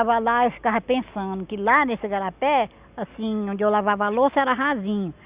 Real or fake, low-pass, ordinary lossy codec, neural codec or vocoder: real; 3.6 kHz; Opus, 64 kbps; none